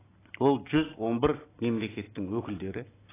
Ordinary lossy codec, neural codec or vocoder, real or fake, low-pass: AAC, 16 kbps; none; real; 3.6 kHz